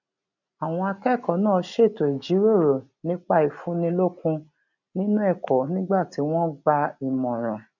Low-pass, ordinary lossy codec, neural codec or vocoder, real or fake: 7.2 kHz; none; none; real